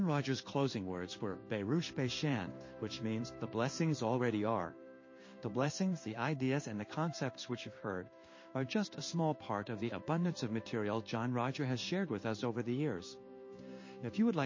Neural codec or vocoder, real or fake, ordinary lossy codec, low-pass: codec, 16 kHz in and 24 kHz out, 1 kbps, XY-Tokenizer; fake; MP3, 32 kbps; 7.2 kHz